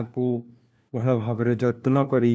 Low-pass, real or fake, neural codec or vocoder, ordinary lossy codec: none; fake; codec, 16 kHz, 1 kbps, FunCodec, trained on LibriTTS, 50 frames a second; none